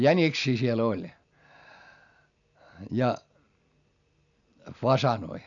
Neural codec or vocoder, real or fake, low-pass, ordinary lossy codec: none; real; 7.2 kHz; none